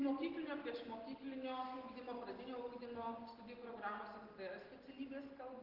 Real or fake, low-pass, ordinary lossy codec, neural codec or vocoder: real; 5.4 kHz; Opus, 16 kbps; none